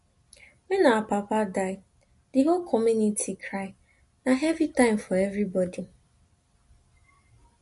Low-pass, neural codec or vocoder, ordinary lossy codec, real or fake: 14.4 kHz; none; MP3, 48 kbps; real